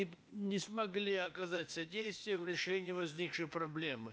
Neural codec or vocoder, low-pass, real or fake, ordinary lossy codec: codec, 16 kHz, 0.8 kbps, ZipCodec; none; fake; none